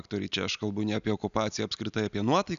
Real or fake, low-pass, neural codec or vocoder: real; 7.2 kHz; none